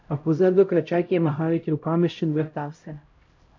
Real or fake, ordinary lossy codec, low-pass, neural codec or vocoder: fake; MP3, 48 kbps; 7.2 kHz; codec, 16 kHz, 0.5 kbps, X-Codec, HuBERT features, trained on LibriSpeech